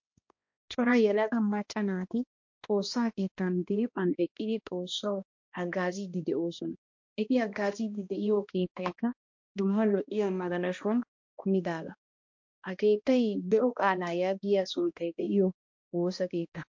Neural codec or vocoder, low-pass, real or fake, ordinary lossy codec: codec, 16 kHz, 1 kbps, X-Codec, HuBERT features, trained on balanced general audio; 7.2 kHz; fake; MP3, 48 kbps